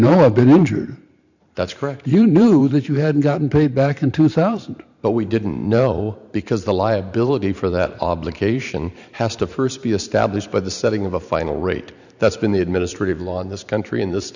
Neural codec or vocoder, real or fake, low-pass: vocoder, 44.1 kHz, 128 mel bands every 512 samples, BigVGAN v2; fake; 7.2 kHz